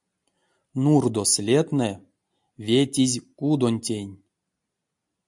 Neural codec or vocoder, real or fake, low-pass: none; real; 10.8 kHz